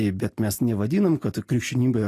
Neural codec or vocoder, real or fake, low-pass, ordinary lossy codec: none; real; 14.4 kHz; AAC, 48 kbps